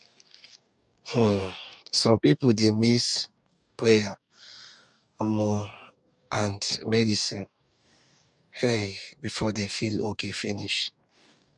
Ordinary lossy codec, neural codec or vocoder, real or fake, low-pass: none; codec, 44.1 kHz, 2.6 kbps, DAC; fake; 10.8 kHz